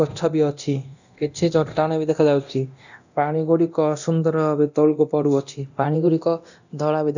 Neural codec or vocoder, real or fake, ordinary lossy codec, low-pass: codec, 24 kHz, 0.9 kbps, DualCodec; fake; none; 7.2 kHz